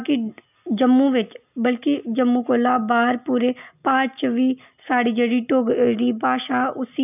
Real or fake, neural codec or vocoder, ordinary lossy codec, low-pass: real; none; none; 3.6 kHz